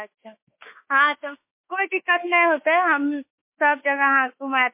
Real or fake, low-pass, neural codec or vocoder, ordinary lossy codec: fake; 3.6 kHz; autoencoder, 48 kHz, 32 numbers a frame, DAC-VAE, trained on Japanese speech; MP3, 24 kbps